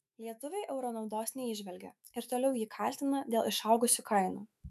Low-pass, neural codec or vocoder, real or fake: 14.4 kHz; autoencoder, 48 kHz, 128 numbers a frame, DAC-VAE, trained on Japanese speech; fake